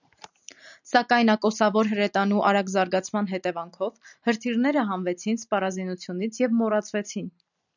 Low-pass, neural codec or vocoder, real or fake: 7.2 kHz; none; real